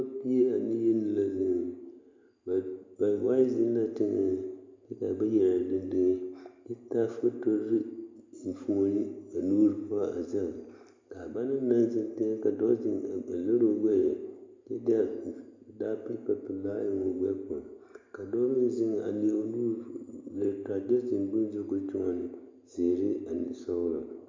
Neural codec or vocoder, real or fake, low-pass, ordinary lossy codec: none; real; 7.2 kHz; MP3, 64 kbps